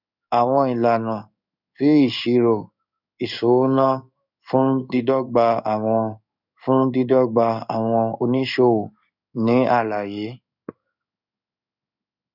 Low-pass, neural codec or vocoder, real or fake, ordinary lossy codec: 5.4 kHz; codec, 16 kHz in and 24 kHz out, 1 kbps, XY-Tokenizer; fake; none